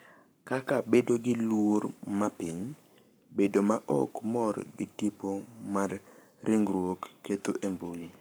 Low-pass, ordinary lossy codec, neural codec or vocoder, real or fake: none; none; codec, 44.1 kHz, 7.8 kbps, Pupu-Codec; fake